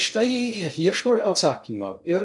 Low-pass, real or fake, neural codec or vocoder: 10.8 kHz; fake; codec, 16 kHz in and 24 kHz out, 0.6 kbps, FocalCodec, streaming, 4096 codes